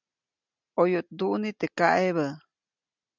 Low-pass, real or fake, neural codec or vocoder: 7.2 kHz; real; none